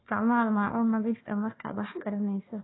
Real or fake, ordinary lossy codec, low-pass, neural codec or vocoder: fake; AAC, 16 kbps; 7.2 kHz; codec, 16 kHz, 1 kbps, FunCodec, trained on Chinese and English, 50 frames a second